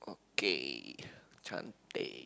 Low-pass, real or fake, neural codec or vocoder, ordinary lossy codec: none; real; none; none